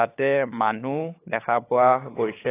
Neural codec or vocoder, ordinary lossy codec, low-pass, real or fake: codec, 16 kHz, 8 kbps, FunCodec, trained on LibriTTS, 25 frames a second; AAC, 16 kbps; 3.6 kHz; fake